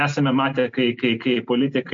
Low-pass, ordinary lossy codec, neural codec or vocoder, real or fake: 7.2 kHz; MP3, 48 kbps; none; real